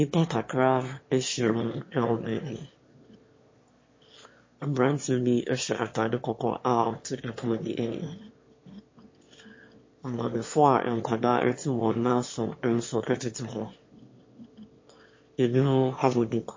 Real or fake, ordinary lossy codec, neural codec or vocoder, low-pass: fake; MP3, 32 kbps; autoencoder, 22.05 kHz, a latent of 192 numbers a frame, VITS, trained on one speaker; 7.2 kHz